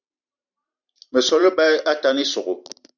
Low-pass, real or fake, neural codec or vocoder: 7.2 kHz; fake; vocoder, 44.1 kHz, 128 mel bands every 512 samples, BigVGAN v2